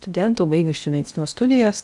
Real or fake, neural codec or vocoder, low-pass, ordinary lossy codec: fake; codec, 16 kHz in and 24 kHz out, 0.8 kbps, FocalCodec, streaming, 65536 codes; 10.8 kHz; MP3, 96 kbps